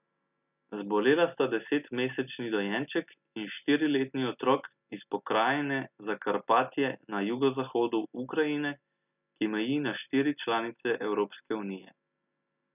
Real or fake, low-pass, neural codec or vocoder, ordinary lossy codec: real; 3.6 kHz; none; none